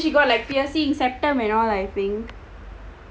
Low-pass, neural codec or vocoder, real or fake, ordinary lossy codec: none; none; real; none